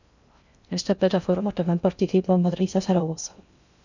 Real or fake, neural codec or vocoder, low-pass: fake; codec, 16 kHz in and 24 kHz out, 0.6 kbps, FocalCodec, streaming, 2048 codes; 7.2 kHz